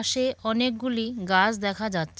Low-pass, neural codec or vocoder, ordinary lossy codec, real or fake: none; none; none; real